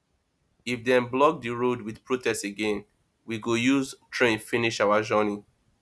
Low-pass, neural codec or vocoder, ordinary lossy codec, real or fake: none; none; none; real